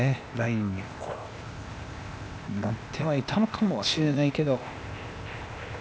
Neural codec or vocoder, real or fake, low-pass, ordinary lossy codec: codec, 16 kHz, 0.8 kbps, ZipCodec; fake; none; none